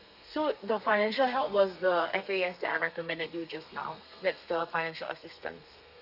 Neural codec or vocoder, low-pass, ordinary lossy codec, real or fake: codec, 32 kHz, 1.9 kbps, SNAC; 5.4 kHz; none; fake